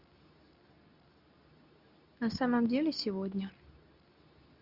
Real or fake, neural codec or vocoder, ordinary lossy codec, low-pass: fake; codec, 24 kHz, 0.9 kbps, WavTokenizer, medium speech release version 2; Opus, 64 kbps; 5.4 kHz